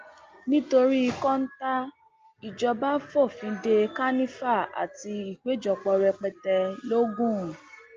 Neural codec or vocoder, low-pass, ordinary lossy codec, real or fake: none; 7.2 kHz; Opus, 24 kbps; real